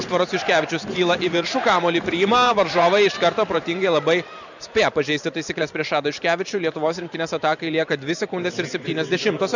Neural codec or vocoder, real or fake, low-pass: vocoder, 44.1 kHz, 128 mel bands every 512 samples, BigVGAN v2; fake; 7.2 kHz